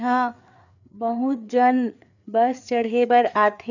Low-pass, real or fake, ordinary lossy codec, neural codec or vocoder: 7.2 kHz; fake; none; codec, 16 kHz, 4 kbps, FreqCodec, larger model